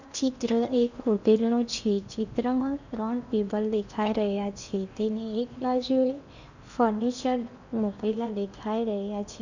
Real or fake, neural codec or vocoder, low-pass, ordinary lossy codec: fake; codec, 16 kHz in and 24 kHz out, 0.8 kbps, FocalCodec, streaming, 65536 codes; 7.2 kHz; none